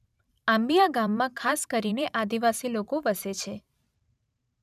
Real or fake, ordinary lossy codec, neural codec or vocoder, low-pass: fake; none; vocoder, 44.1 kHz, 128 mel bands every 512 samples, BigVGAN v2; 14.4 kHz